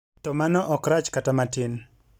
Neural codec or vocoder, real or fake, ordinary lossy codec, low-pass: none; real; none; none